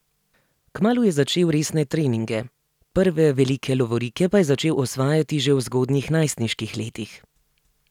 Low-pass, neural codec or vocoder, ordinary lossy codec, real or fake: 19.8 kHz; none; none; real